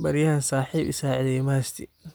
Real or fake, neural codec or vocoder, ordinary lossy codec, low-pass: real; none; none; none